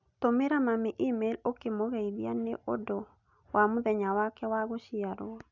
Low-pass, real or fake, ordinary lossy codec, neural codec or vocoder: 7.2 kHz; real; none; none